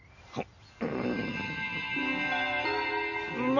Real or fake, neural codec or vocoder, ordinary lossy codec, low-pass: real; none; none; 7.2 kHz